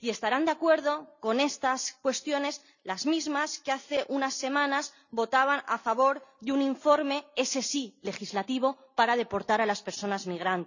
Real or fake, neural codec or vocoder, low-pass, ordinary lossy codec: real; none; 7.2 kHz; none